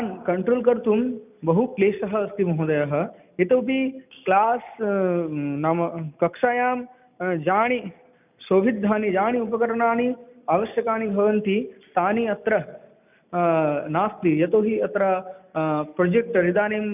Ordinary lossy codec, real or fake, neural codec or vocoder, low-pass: none; real; none; 3.6 kHz